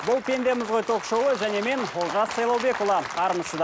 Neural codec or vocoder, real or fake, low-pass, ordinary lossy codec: none; real; none; none